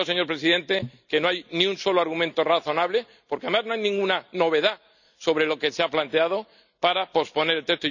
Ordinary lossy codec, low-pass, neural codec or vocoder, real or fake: none; 7.2 kHz; none; real